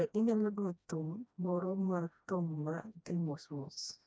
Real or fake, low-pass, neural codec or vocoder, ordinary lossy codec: fake; none; codec, 16 kHz, 1 kbps, FreqCodec, smaller model; none